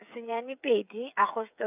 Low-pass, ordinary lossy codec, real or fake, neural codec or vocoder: 3.6 kHz; none; fake; codec, 16 kHz, 8 kbps, FreqCodec, smaller model